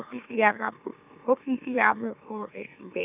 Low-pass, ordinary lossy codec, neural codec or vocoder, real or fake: 3.6 kHz; none; autoencoder, 44.1 kHz, a latent of 192 numbers a frame, MeloTTS; fake